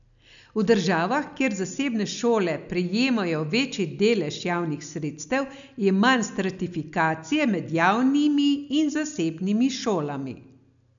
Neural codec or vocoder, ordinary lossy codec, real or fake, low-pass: none; none; real; 7.2 kHz